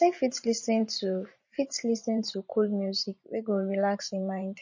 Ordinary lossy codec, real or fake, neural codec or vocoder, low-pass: MP3, 32 kbps; fake; vocoder, 44.1 kHz, 128 mel bands every 512 samples, BigVGAN v2; 7.2 kHz